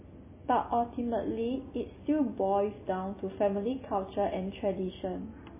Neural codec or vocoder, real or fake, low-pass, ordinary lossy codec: none; real; 3.6 kHz; MP3, 16 kbps